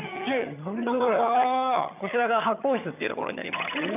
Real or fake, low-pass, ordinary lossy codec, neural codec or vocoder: fake; 3.6 kHz; none; vocoder, 22.05 kHz, 80 mel bands, HiFi-GAN